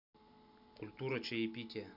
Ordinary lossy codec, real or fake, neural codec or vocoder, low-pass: none; real; none; 5.4 kHz